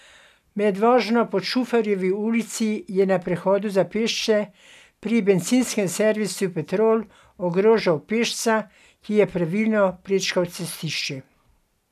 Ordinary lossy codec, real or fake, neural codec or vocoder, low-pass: none; real; none; 14.4 kHz